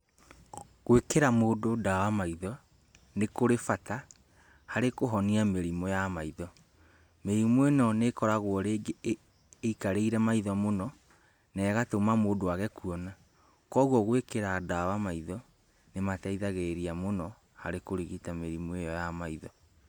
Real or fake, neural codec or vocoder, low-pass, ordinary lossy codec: real; none; 19.8 kHz; none